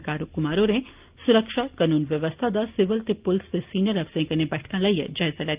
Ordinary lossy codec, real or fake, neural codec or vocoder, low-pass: Opus, 24 kbps; real; none; 3.6 kHz